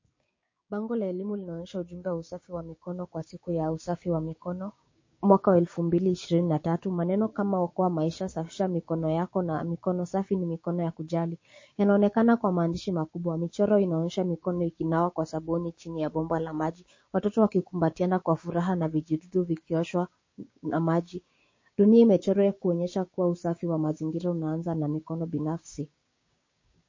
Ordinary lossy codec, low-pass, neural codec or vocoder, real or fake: MP3, 32 kbps; 7.2 kHz; codec, 16 kHz, 6 kbps, DAC; fake